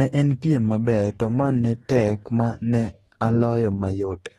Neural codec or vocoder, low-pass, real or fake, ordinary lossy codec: codec, 44.1 kHz, 2.6 kbps, DAC; 19.8 kHz; fake; AAC, 32 kbps